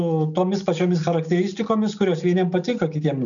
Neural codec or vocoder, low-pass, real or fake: none; 7.2 kHz; real